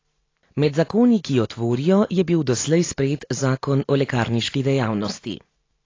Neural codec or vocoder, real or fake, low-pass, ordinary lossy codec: none; real; 7.2 kHz; AAC, 32 kbps